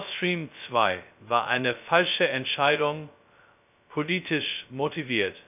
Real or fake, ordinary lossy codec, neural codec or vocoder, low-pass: fake; none; codec, 16 kHz, 0.2 kbps, FocalCodec; 3.6 kHz